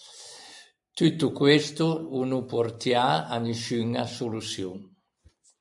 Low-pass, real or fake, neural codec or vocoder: 10.8 kHz; real; none